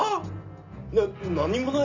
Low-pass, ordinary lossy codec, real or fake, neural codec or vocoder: 7.2 kHz; none; real; none